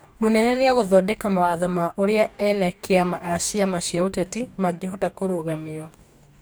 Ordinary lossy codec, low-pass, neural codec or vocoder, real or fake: none; none; codec, 44.1 kHz, 2.6 kbps, DAC; fake